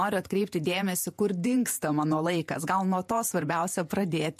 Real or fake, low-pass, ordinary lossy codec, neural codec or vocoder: fake; 14.4 kHz; MP3, 64 kbps; vocoder, 48 kHz, 128 mel bands, Vocos